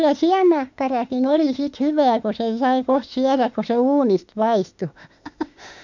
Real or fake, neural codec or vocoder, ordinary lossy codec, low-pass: fake; autoencoder, 48 kHz, 32 numbers a frame, DAC-VAE, trained on Japanese speech; none; 7.2 kHz